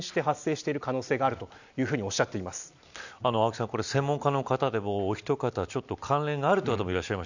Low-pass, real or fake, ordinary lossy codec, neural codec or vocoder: 7.2 kHz; real; none; none